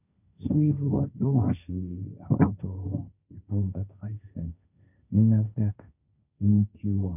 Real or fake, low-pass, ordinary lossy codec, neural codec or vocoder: fake; 3.6 kHz; none; codec, 16 kHz, 1.1 kbps, Voila-Tokenizer